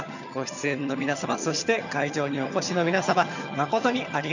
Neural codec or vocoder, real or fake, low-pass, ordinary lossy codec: vocoder, 22.05 kHz, 80 mel bands, HiFi-GAN; fake; 7.2 kHz; none